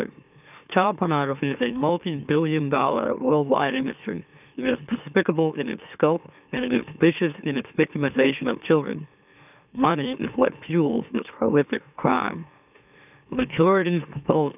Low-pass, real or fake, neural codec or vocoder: 3.6 kHz; fake; autoencoder, 44.1 kHz, a latent of 192 numbers a frame, MeloTTS